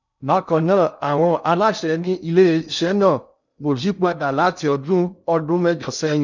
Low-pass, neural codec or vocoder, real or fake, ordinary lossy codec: 7.2 kHz; codec, 16 kHz in and 24 kHz out, 0.6 kbps, FocalCodec, streaming, 2048 codes; fake; none